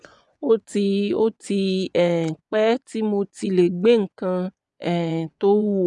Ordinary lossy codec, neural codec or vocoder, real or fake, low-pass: none; vocoder, 24 kHz, 100 mel bands, Vocos; fake; 10.8 kHz